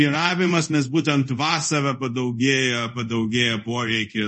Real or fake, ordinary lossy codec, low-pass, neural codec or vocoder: fake; MP3, 32 kbps; 10.8 kHz; codec, 24 kHz, 0.5 kbps, DualCodec